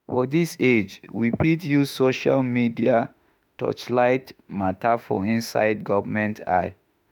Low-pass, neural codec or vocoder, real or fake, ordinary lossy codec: 19.8 kHz; autoencoder, 48 kHz, 32 numbers a frame, DAC-VAE, trained on Japanese speech; fake; none